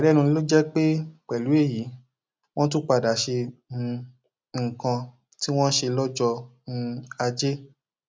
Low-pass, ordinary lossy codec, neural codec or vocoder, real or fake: none; none; none; real